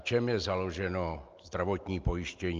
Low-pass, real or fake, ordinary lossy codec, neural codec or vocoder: 7.2 kHz; real; Opus, 24 kbps; none